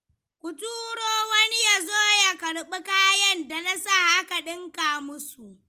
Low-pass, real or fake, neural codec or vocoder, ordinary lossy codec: 14.4 kHz; real; none; Opus, 24 kbps